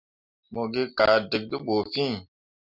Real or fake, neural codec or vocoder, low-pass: real; none; 5.4 kHz